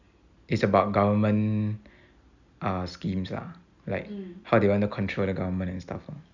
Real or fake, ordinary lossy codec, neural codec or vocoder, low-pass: real; Opus, 64 kbps; none; 7.2 kHz